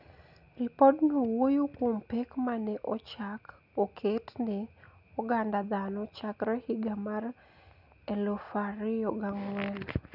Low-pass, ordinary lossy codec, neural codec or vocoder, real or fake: 5.4 kHz; none; none; real